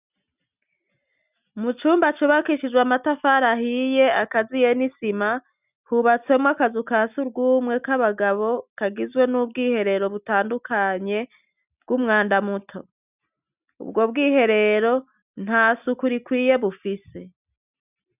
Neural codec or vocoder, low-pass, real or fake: none; 3.6 kHz; real